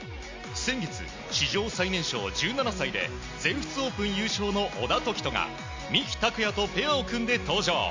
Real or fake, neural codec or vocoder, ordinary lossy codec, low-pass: real; none; MP3, 64 kbps; 7.2 kHz